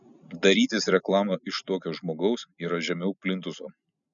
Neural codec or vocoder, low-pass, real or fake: none; 7.2 kHz; real